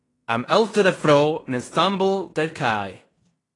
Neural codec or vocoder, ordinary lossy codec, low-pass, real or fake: codec, 16 kHz in and 24 kHz out, 0.9 kbps, LongCat-Audio-Codec, fine tuned four codebook decoder; AAC, 32 kbps; 10.8 kHz; fake